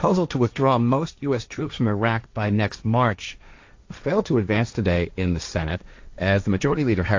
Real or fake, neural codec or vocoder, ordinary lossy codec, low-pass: fake; codec, 16 kHz, 1.1 kbps, Voila-Tokenizer; AAC, 48 kbps; 7.2 kHz